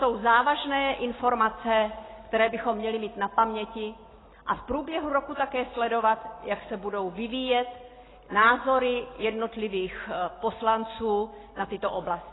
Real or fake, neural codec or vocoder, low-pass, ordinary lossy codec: real; none; 7.2 kHz; AAC, 16 kbps